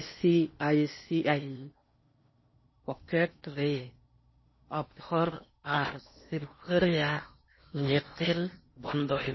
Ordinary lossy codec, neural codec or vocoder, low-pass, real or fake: MP3, 24 kbps; codec, 16 kHz in and 24 kHz out, 0.8 kbps, FocalCodec, streaming, 65536 codes; 7.2 kHz; fake